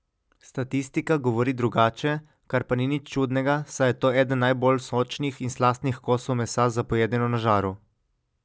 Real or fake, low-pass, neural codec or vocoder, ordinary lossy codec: real; none; none; none